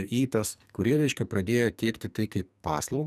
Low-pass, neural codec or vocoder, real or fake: 14.4 kHz; codec, 44.1 kHz, 2.6 kbps, SNAC; fake